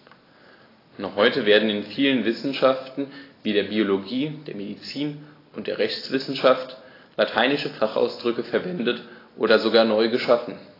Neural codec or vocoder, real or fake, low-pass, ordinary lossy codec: none; real; 5.4 kHz; AAC, 24 kbps